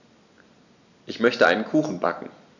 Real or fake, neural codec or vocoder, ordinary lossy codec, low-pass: real; none; none; 7.2 kHz